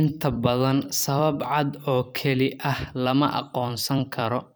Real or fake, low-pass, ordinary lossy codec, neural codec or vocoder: fake; none; none; vocoder, 44.1 kHz, 128 mel bands every 256 samples, BigVGAN v2